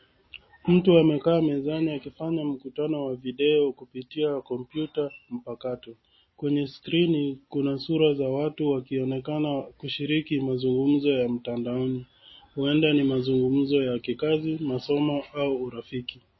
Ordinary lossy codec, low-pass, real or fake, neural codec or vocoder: MP3, 24 kbps; 7.2 kHz; real; none